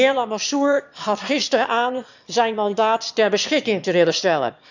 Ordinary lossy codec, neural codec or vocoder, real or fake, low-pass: none; autoencoder, 22.05 kHz, a latent of 192 numbers a frame, VITS, trained on one speaker; fake; 7.2 kHz